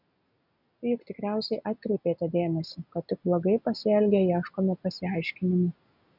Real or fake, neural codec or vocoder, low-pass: real; none; 5.4 kHz